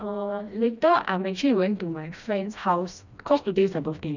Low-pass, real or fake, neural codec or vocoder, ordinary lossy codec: 7.2 kHz; fake; codec, 16 kHz, 1 kbps, FreqCodec, smaller model; none